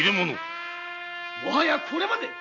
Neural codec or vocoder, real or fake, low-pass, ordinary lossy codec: none; real; 7.2 kHz; none